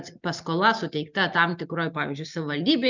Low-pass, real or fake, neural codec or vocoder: 7.2 kHz; real; none